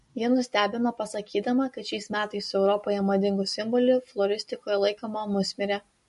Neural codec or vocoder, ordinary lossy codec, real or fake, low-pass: none; MP3, 48 kbps; real; 14.4 kHz